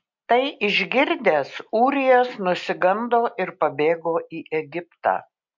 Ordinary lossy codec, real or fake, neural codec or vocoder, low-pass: MP3, 48 kbps; real; none; 7.2 kHz